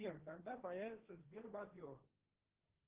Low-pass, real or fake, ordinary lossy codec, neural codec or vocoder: 3.6 kHz; fake; Opus, 16 kbps; codec, 16 kHz, 1.1 kbps, Voila-Tokenizer